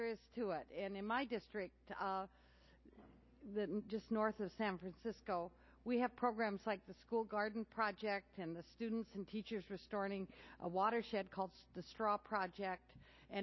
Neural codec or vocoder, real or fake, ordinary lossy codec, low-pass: none; real; MP3, 24 kbps; 7.2 kHz